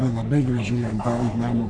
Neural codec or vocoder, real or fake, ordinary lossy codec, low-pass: codec, 44.1 kHz, 3.4 kbps, Pupu-Codec; fake; MP3, 96 kbps; 9.9 kHz